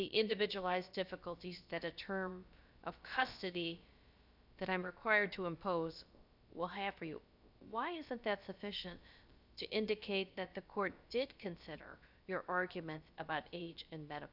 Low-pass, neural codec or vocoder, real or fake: 5.4 kHz; codec, 16 kHz, about 1 kbps, DyCAST, with the encoder's durations; fake